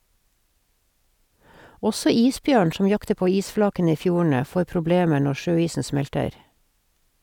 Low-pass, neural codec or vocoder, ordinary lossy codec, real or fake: 19.8 kHz; none; none; real